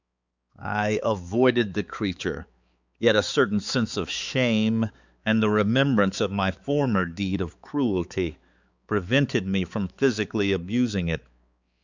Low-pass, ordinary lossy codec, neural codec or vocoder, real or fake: 7.2 kHz; Opus, 64 kbps; codec, 16 kHz, 4 kbps, X-Codec, HuBERT features, trained on balanced general audio; fake